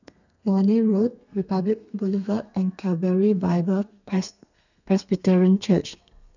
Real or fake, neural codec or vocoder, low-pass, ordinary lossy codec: fake; codec, 32 kHz, 1.9 kbps, SNAC; 7.2 kHz; none